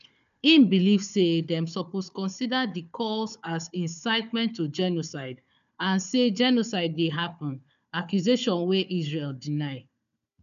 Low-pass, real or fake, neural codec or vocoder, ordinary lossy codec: 7.2 kHz; fake; codec, 16 kHz, 4 kbps, FunCodec, trained on Chinese and English, 50 frames a second; none